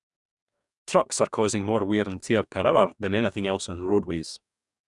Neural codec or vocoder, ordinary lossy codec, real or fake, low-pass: codec, 44.1 kHz, 2.6 kbps, DAC; none; fake; 10.8 kHz